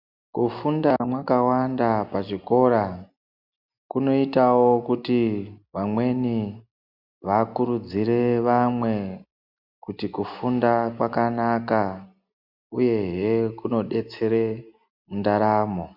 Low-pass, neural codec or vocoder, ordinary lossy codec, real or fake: 5.4 kHz; none; MP3, 48 kbps; real